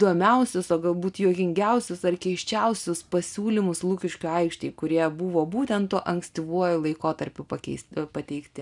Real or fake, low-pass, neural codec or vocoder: real; 10.8 kHz; none